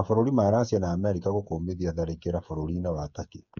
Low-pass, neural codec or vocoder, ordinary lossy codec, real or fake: 7.2 kHz; codec, 16 kHz, 8 kbps, FreqCodec, smaller model; none; fake